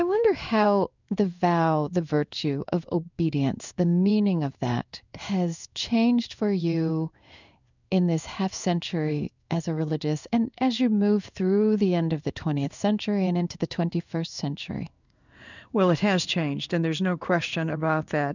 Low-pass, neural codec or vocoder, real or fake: 7.2 kHz; codec, 16 kHz in and 24 kHz out, 1 kbps, XY-Tokenizer; fake